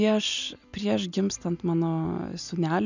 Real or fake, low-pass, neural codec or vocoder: real; 7.2 kHz; none